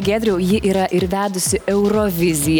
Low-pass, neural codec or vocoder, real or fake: 19.8 kHz; none; real